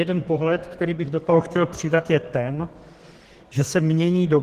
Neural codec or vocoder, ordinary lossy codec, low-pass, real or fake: codec, 32 kHz, 1.9 kbps, SNAC; Opus, 16 kbps; 14.4 kHz; fake